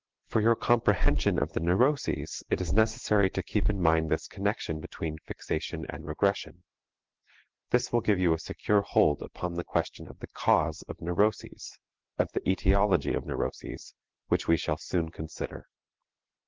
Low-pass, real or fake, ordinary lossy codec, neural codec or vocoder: 7.2 kHz; real; Opus, 16 kbps; none